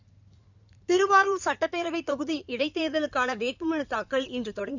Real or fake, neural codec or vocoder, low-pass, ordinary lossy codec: fake; codec, 16 kHz in and 24 kHz out, 2.2 kbps, FireRedTTS-2 codec; 7.2 kHz; none